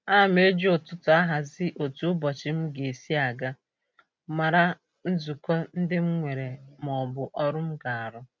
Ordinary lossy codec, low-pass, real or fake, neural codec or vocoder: none; none; real; none